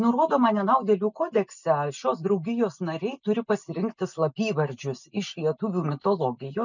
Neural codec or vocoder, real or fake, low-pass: none; real; 7.2 kHz